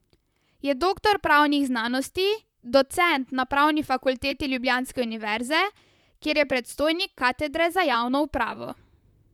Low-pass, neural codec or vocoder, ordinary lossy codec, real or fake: 19.8 kHz; vocoder, 44.1 kHz, 128 mel bands, Pupu-Vocoder; none; fake